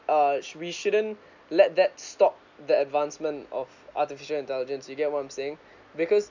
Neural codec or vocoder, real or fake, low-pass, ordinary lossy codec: none; real; 7.2 kHz; none